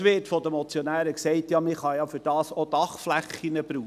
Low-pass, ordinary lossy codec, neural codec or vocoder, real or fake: 14.4 kHz; none; none; real